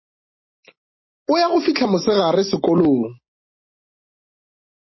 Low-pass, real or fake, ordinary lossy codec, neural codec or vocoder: 7.2 kHz; real; MP3, 24 kbps; none